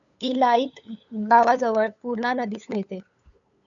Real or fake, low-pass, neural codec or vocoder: fake; 7.2 kHz; codec, 16 kHz, 8 kbps, FunCodec, trained on LibriTTS, 25 frames a second